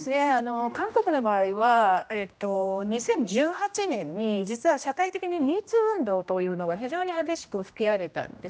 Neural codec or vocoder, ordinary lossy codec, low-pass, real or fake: codec, 16 kHz, 1 kbps, X-Codec, HuBERT features, trained on general audio; none; none; fake